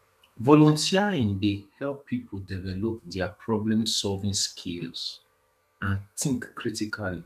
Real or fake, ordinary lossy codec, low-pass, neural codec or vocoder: fake; none; 14.4 kHz; codec, 32 kHz, 1.9 kbps, SNAC